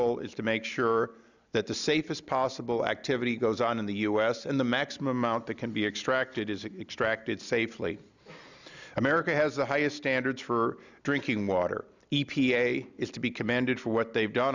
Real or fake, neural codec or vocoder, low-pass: real; none; 7.2 kHz